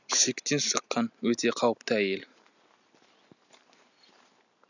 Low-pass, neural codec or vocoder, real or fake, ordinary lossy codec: 7.2 kHz; none; real; none